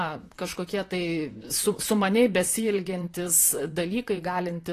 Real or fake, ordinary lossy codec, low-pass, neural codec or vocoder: fake; AAC, 48 kbps; 14.4 kHz; vocoder, 44.1 kHz, 128 mel bands, Pupu-Vocoder